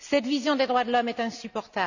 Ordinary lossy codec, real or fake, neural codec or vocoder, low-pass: none; real; none; 7.2 kHz